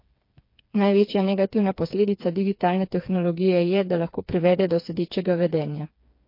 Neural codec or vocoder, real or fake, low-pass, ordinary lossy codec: codec, 16 kHz, 4 kbps, FreqCodec, smaller model; fake; 5.4 kHz; MP3, 32 kbps